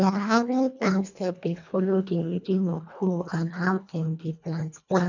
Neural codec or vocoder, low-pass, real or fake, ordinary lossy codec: codec, 24 kHz, 1.5 kbps, HILCodec; 7.2 kHz; fake; none